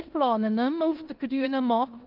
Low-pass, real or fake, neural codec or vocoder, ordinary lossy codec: 5.4 kHz; fake; codec, 16 kHz in and 24 kHz out, 0.9 kbps, LongCat-Audio-Codec, four codebook decoder; Opus, 24 kbps